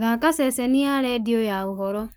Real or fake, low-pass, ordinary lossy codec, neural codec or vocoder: fake; none; none; codec, 44.1 kHz, 7.8 kbps, Pupu-Codec